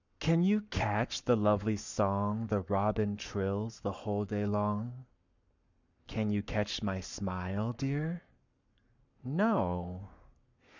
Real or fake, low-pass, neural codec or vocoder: fake; 7.2 kHz; codec, 44.1 kHz, 7.8 kbps, Pupu-Codec